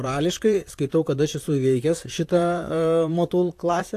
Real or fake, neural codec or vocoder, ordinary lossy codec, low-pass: fake; vocoder, 44.1 kHz, 128 mel bands, Pupu-Vocoder; AAC, 64 kbps; 14.4 kHz